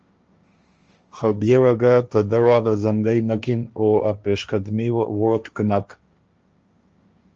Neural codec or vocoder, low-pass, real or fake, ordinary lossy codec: codec, 16 kHz, 1.1 kbps, Voila-Tokenizer; 7.2 kHz; fake; Opus, 24 kbps